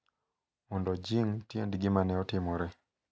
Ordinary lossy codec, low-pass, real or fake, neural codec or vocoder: none; none; real; none